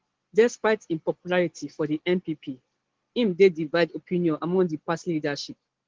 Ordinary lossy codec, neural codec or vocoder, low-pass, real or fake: Opus, 16 kbps; none; 7.2 kHz; real